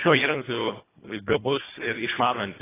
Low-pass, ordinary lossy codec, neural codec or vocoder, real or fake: 3.6 kHz; AAC, 16 kbps; codec, 24 kHz, 1.5 kbps, HILCodec; fake